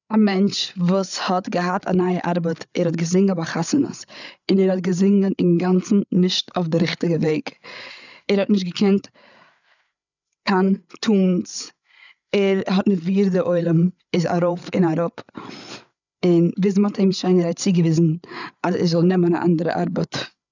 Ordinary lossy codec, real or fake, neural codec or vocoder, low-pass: none; fake; codec, 16 kHz, 8 kbps, FreqCodec, larger model; 7.2 kHz